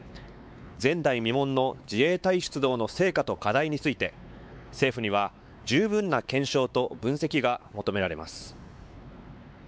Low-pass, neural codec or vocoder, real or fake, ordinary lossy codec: none; codec, 16 kHz, 4 kbps, X-Codec, WavLM features, trained on Multilingual LibriSpeech; fake; none